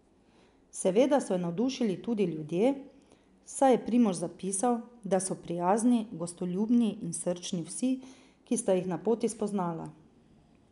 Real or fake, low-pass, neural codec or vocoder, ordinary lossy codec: real; 10.8 kHz; none; none